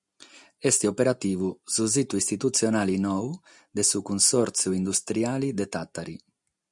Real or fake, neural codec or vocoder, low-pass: real; none; 10.8 kHz